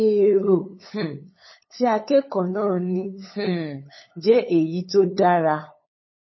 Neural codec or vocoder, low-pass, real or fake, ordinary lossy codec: codec, 16 kHz, 8 kbps, FunCodec, trained on LibriTTS, 25 frames a second; 7.2 kHz; fake; MP3, 24 kbps